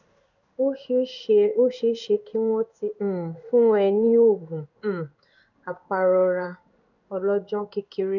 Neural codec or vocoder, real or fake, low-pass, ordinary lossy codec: codec, 16 kHz in and 24 kHz out, 1 kbps, XY-Tokenizer; fake; 7.2 kHz; none